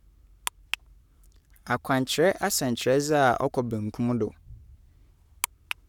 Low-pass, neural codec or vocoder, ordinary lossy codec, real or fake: 19.8 kHz; codec, 44.1 kHz, 7.8 kbps, Pupu-Codec; none; fake